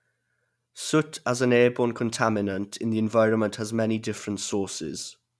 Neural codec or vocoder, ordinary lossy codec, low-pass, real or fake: none; none; none; real